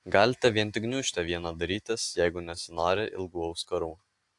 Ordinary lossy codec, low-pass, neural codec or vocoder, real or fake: AAC, 64 kbps; 10.8 kHz; none; real